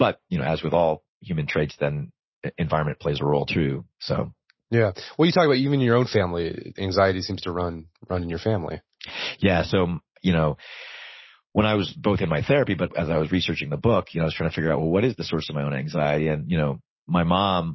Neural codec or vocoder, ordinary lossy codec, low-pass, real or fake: none; MP3, 24 kbps; 7.2 kHz; real